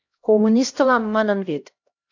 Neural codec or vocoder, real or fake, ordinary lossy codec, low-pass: codec, 16 kHz, 1 kbps, X-Codec, HuBERT features, trained on LibriSpeech; fake; AAC, 48 kbps; 7.2 kHz